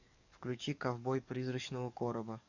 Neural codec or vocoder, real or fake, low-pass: codec, 44.1 kHz, 7.8 kbps, DAC; fake; 7.2 kHz